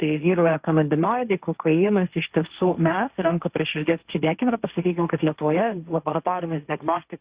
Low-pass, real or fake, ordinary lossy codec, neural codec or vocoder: 3.6 kHz; fake; Opus, 64 kbps; codec, 16 kHz, 1.1 kbps, Voila-Tokenizer